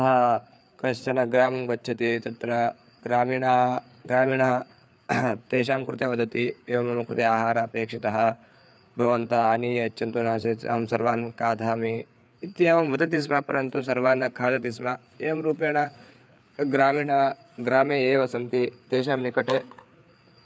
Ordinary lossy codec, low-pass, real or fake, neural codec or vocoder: none; none; fake; codec, 16 kHz, 4 kbps, FreqCodec, larger model